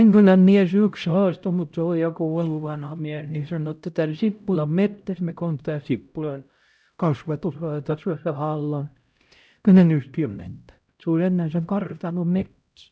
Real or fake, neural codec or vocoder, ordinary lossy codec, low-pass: fake; codec, 16 kHz, 0.5 kbps, X-Codec, HuBERT features, trained on LibriSpeech; none; none